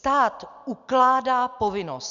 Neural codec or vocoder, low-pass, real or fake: none; 7.2 kHz; real